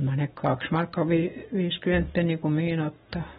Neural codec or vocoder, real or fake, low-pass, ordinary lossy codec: none; real; 7.2 kHz; AAC, 16 kbps